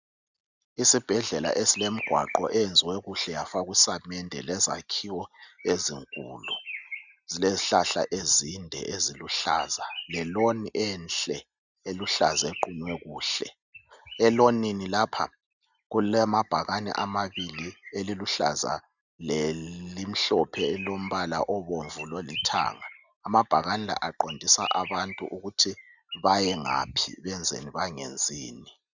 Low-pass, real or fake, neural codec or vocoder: 7.2 kHz; real; none